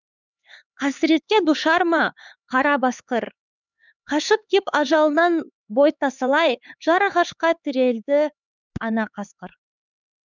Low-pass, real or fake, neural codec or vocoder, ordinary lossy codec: 7.2 kHz; fake; codec, 16 kHz, 4 kbps, X-Codec, HuBERT features, trained on LibriSpeech; none